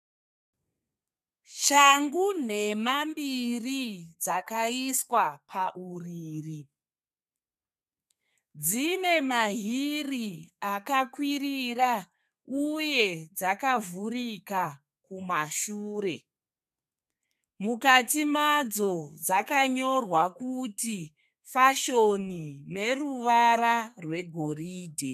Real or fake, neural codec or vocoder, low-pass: fake; codec, 32 kHz, 1.9 kbps, SNAC; 14.4 kHz